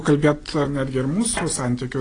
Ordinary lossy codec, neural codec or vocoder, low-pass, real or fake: AAC, 32 kbps; none; 9.9 kHz; real